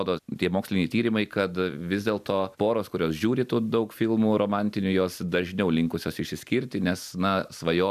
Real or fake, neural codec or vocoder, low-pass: real; none; 14.4 kHz